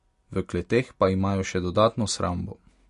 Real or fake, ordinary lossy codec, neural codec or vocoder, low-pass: real; MP3, 48 kbps; none; 10.8 kHz